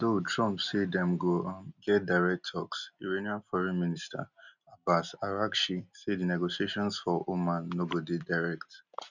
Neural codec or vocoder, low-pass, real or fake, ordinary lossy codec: none; 7.2 kHz; real; none